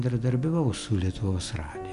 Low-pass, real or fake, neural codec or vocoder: 10.8 kHz; real; none